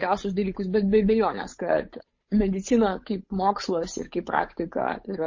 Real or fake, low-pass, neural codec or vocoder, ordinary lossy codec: fake; 7.2 kHz; codec, 16 kHz, 8 kbps, FunCodec, trained on Chinese and English, 25 frames a second; MP3, 32 kbps